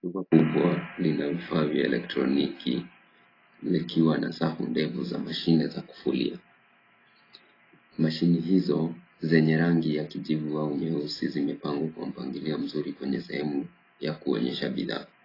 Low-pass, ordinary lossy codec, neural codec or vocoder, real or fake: 5.4 kHz; AAC, 24 kbps; none; real